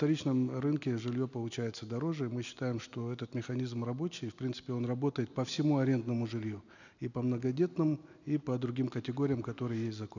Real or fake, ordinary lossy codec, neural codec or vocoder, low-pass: real; none; none; 7.2 kHz